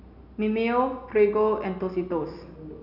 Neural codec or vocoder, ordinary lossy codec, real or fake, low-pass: none; none; real; 5.4 kHz